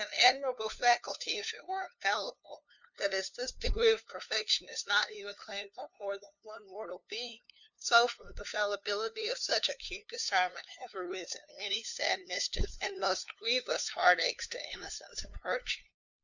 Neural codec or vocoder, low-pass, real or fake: codec, 16 kHz, 2 kbps, FunCodec, trained on LibriTTS, 25 frames a second; 7.2 kHz; fake